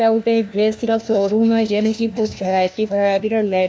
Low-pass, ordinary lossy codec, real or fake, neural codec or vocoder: none; none; fake; codec, 16 kHz, 1 kbps, FunCodec, trained on LibriTTS, 50 frames a second